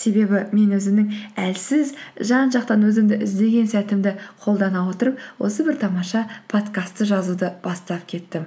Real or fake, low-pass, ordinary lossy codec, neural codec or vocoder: real; none; none; none